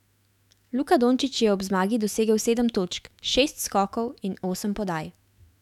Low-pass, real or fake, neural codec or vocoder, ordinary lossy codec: 19.8 kHz; fake; autoencoder, 48 kHz, 128 numbers a frame, DAC-VAE, trained on Japanese speech; none